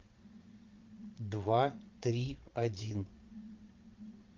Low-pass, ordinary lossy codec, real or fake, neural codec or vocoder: 7.2 kHz; Opus, 24 kbps; fake; vocoder, 22.05 kHz, 80 mel bands, Vocos